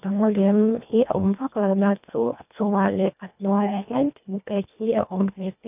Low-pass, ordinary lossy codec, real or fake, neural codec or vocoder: 3.6 kHz; none; fake; codec, 24 kHz, 1.5 kbps, HILCodec